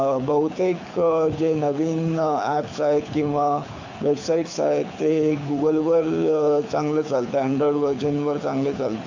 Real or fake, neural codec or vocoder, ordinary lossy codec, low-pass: fake; codec, 24 kHz, 6 kbps, HILCodec; AAC, 48 kbps; 7.2 kHz